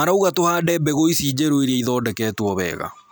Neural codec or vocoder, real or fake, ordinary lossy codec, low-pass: none; real; none; none